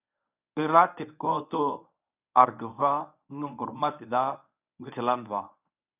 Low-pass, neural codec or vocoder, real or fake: 3.6 kHz; codec, 24 kHz, 0.9 kbps, WavTokenizer, medium speech release version 1; fake